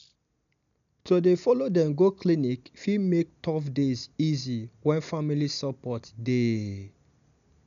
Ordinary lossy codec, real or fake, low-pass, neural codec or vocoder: none; real; 7.2 kHz; none